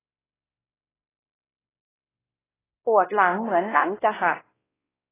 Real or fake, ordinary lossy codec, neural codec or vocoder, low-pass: fake; AAC, 16 kbps; codec, 16 kHz, 1 kbps, X-Codec, WavLM features, trained on Multilingual LibriSpeech; 3.6 kHz